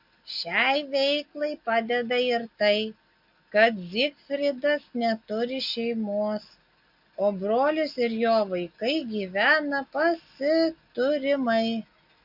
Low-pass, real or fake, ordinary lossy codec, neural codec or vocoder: 5.4 kHz; real; MP3, 48 kbps; none